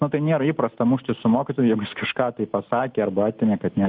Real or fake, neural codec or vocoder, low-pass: real; none; 5.4 kHz